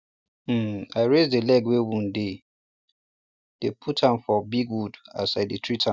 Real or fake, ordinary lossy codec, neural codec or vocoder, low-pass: real; none; none; none